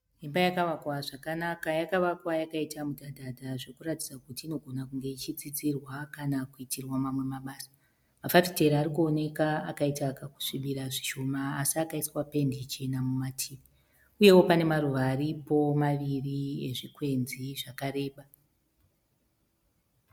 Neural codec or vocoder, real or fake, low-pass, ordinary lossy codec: none; real; 19.8 kHz; MP3, 96 kbps